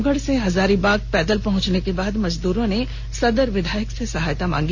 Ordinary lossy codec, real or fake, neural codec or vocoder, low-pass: Opus, 64 kbps; real; none; 7.2 kHz